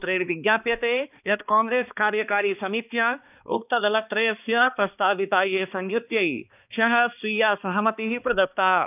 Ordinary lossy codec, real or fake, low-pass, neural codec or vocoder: none; fake; 3.6 kHz; codec, 16 kHz, 2 kbps, X-Codec, HuBERT features, trained on balanced general audio